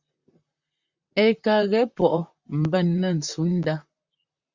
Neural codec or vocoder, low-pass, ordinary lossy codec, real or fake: vocoder, 44.1 kHz, 128 mel bands, Pupu-Vocoder; 7.2 kHz; Opus, 64 kbps; fake